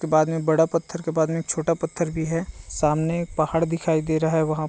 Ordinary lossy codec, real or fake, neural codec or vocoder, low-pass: none; real; none; none